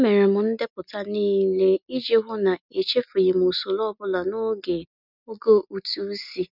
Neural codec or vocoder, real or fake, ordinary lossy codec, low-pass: none; real; none; 5.4 kHz